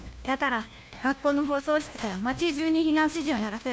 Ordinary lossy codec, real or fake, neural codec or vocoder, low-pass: none; fake; codec, 16 kHz, 1 kbps, FunCodec, trained on LibriTTS, 50 frames a second; none